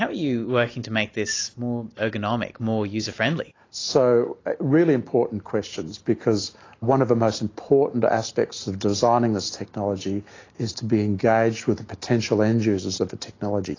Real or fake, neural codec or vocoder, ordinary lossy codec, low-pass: real; none; AAC, 32 kbps; 7.2 kHz